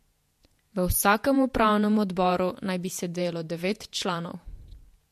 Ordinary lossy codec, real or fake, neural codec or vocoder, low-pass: MP3, 64 kbps; fake; vocoder, 48 kHz, 128 mel bands, Vocos; 14.4 kHz